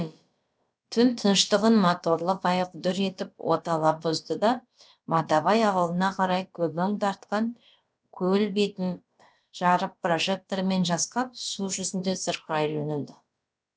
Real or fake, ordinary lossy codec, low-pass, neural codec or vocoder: fake; none; none; codec, 16 kHz, about 1 kbps, DyCAST, with the encoder's durations